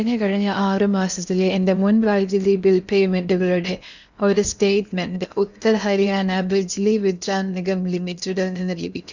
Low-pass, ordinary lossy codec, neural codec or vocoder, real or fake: 7.2 kHz; none; codec, 16 kHz in and 24 kHz out, 0.8 kbps, FocalCodec, streaming, 65536 codes; fake